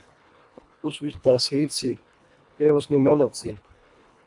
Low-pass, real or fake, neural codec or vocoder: 10.8 kHz; fake; codec, 24 kHz, 1.5 kbps, HILCodec